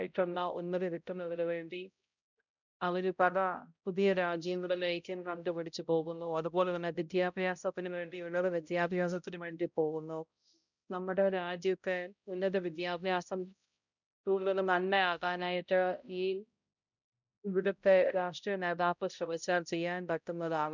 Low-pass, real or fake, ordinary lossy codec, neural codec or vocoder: 7.2 kHz; fake; none; codec, 16 kHz, 0.5 kbps, X-Codec, HuBERT features, trained on balanced general audio